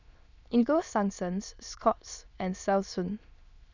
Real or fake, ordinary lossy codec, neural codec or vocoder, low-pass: fake; none; autoencoder, 22.05 kHz, a latent of 192 numbers a frame, VITS, trained on many speakers; 7.2 kHz